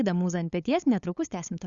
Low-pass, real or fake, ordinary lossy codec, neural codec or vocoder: 7.2 kHz; fake; Opus, 64 kbps; codec, 16 kHz, 8 kbps, FunCodec, trained on LibriTTS, 25 frames a second